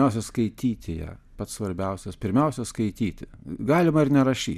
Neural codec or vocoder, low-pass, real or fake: none; 14.4 kHz; real